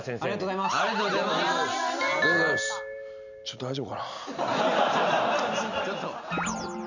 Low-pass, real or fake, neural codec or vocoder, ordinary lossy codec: 7.2 kHz; real; none; none